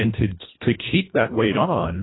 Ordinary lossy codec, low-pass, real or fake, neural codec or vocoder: AAC, 16 kbps; 7.2 kHz; fake; codec, 24 kHz, 1.5 kbps, HILCodec